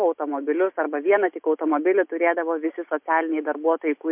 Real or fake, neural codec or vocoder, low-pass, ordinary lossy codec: real; none; 3.6 kHz; MP3, 32 kbps